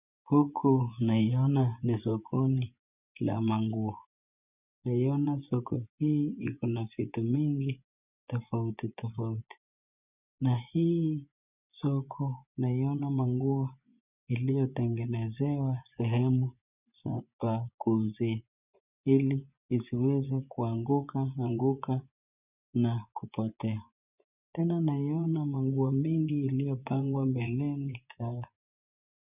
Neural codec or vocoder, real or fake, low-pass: vocoder, 24 kHz, 100 mel bands, Vocos; fake; 3.6 kHz